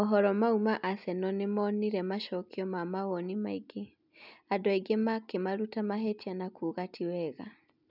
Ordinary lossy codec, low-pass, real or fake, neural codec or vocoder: none; 5.4 kHz; real; none